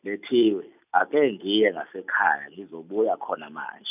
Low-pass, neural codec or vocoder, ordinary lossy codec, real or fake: 3.6 kHz; none; none; real